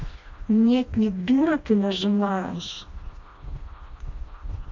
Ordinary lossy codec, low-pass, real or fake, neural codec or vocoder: AAC, 48 kbps; 7.2 kHz; fake; codec, 16 kHz, 1 kbps, FreqCodec, smaller model